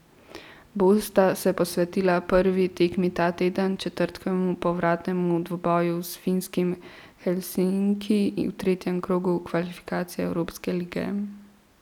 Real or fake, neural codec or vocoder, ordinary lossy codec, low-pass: real; none; none; 19.8 kHz